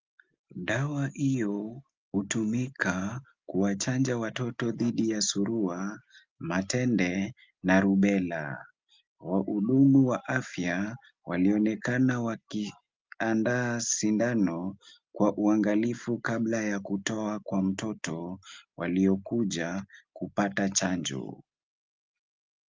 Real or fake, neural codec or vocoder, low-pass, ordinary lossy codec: real; none; 7.2 kHz; Opus, 24 kbps